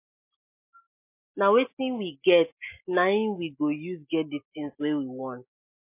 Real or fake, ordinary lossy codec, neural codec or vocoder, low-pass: real; MP3, 24 kbps; none; 3.6 kHz